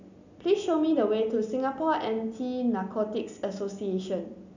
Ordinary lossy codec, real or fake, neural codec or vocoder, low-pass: none; real; none; 7.2 kHz